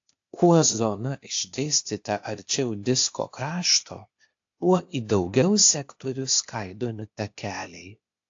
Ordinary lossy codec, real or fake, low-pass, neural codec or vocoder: AAC, 48 kbps; fake; 7.2 kHz; codec, 16 kHz, 0.8 kbps, ZipCodec